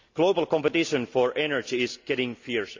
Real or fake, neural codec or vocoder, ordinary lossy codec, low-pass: real; none; MP3, 64 kbps; 7.2 kHz